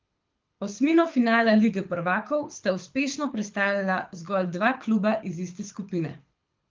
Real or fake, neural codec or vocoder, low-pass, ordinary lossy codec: fake; codec, 24 kHz, 6 kbps, HILCodec; 7.2 kHz; Opus, 32 kbps